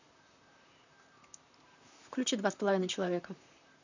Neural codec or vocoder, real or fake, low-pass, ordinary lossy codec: vocoder, 44.1 kHz, 128 mel bands, Pupu-Vocoder; fake; 7.2 kHz; none